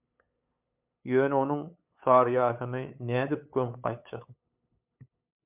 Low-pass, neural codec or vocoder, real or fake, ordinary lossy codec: 3.6 kHz; codec, 16 kHz, 8 kbps, FunCodec, trained on LibriTTS, 25 frames a second; fake; MP3, 32 kbps